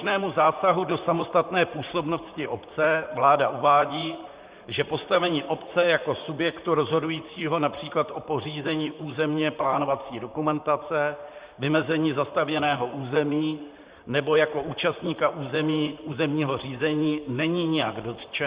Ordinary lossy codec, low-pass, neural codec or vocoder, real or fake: Opus, 64 kbps; 3.6 kHz; vocoder, 44.1 kHz, 128 mel bands, Pupu-Vocoder; fake